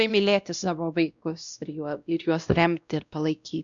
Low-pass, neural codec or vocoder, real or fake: 7.2 kHz; codec, 16 kHz, 1 kbps, X-Codec, WavLM features, trained on Multilingual LibriSpeech; fake